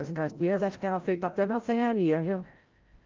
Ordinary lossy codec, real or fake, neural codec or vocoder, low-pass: Opus, 32 kbps; fake; codec, 16 kHz, 0.5 kbps, FreqCodec, larger model; 7.2 kHz